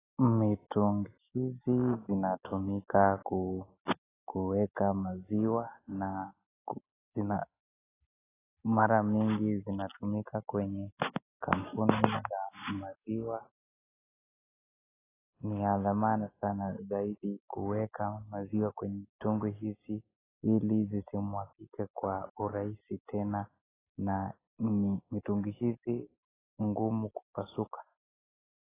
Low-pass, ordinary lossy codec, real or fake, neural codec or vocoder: 3.6 kHz; AAC, 16 kbps; real; none